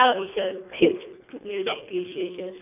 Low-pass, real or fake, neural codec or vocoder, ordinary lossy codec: 3.6 kHz; fake; codec, 24 kHz, 1.5 kbps, HILCodec; AAC, 32 kbps